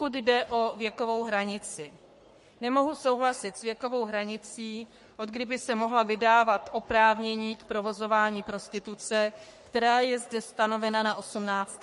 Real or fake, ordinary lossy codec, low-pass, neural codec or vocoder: fake; MP3, 48 kbps; 14.4 kHz; codec, 44.1 kHz, 3.4 kbps, Pupu-Codec